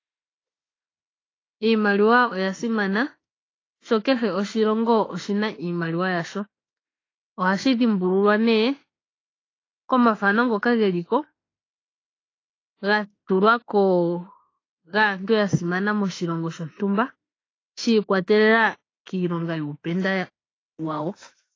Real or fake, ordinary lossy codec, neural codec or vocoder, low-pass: fake; AAC, 32 kbps; autoencoder, 48 kHz, 32 numbers a frame, DAC-VAE, trained on Japanese speech; 7.2 kHz